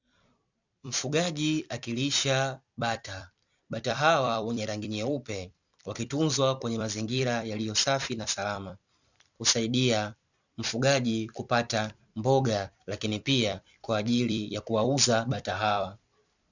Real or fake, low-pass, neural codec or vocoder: fake; 7.2 kHz; vocoder, 44.1 kHz, 128 mel bands every 256 samples, BigVGAN v2